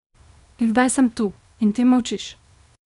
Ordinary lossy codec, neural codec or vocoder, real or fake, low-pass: none; codec, 24 kHz, 0.9 kbps, WavTokenizer, small release; fake; 10.8 kHz